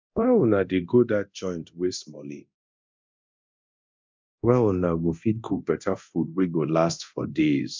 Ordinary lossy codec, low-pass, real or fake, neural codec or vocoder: MP3, 64 kbps; 7.2 kHz; fake; codec, 24 kHz, 0.9 kbps, DualCodec